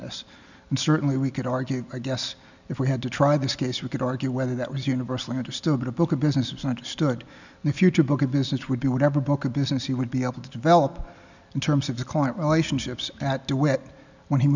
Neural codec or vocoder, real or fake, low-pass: none; real; 7.2 kHz